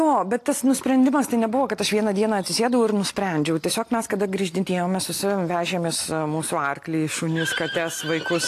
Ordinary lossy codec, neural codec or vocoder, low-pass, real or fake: AAC, 64 kbps; none; 14.4 kHz; real